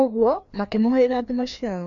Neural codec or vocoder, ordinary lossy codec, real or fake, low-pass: codec, 16 kHz, 2 kbps, FreqCodec, larger model; none; fake; 7.2 kHz